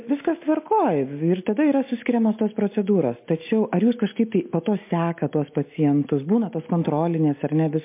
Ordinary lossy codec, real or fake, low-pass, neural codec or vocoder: MP3, 24 kbps; real; 3.6 kHz; none